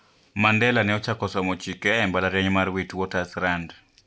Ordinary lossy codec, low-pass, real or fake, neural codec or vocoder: none; none; real; none